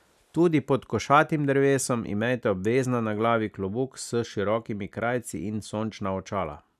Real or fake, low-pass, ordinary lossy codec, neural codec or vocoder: real; 14.4 kHz; none; none